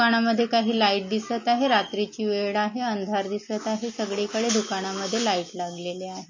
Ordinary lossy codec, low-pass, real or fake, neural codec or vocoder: MP3, 32 kbps; 7.2 kHz; real; none